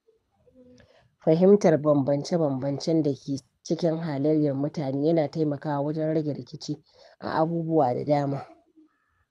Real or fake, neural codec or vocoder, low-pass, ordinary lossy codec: fake; codec, 24 kHz, 6 kbps, HILCodec; none; none